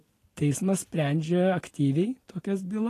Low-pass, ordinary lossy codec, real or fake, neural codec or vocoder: 14.4 kHz; AAC, 48 kbps; real; none